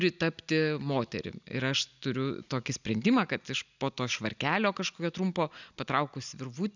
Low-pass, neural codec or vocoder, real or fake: 7.2 kHz; none; real